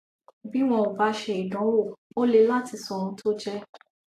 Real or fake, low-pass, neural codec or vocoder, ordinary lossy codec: real; 14.4 kHz; none; AAC, 64 kbps